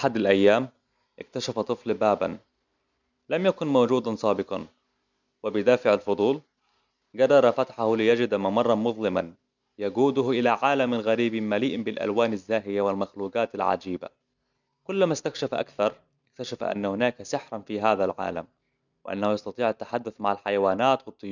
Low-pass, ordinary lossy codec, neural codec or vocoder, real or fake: 7.2 kHz; none; none; real